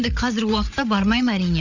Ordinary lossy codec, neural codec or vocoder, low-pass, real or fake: none; codec, 16 kHz, 8 kbps, FreqCodec, larger model; 7.2 kHz; fake